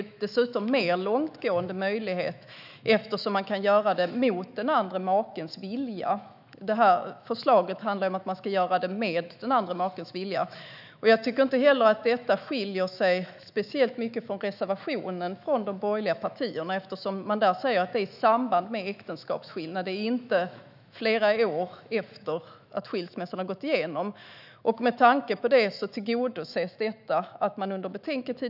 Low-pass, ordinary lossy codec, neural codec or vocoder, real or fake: 5.4 kHz; none; autoencoder, 48 kHz, 128 numbers a frame, DAC-VAE, trained on Japanese speech; fake